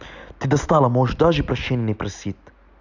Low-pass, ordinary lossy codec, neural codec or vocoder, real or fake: 7.2 kHz; none; none; real